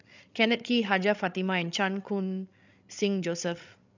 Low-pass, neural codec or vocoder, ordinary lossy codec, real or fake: 7.2 kHz; codec, 16 kHz, 16 kbps, FreqCodec, larger model; none; fake